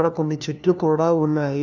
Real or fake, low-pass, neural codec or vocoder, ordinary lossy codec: fake; 7.2 kHz; codec, 16 kHz, 1 kbps, FunCodec, trained on LibriTTS, 50 frames a second; none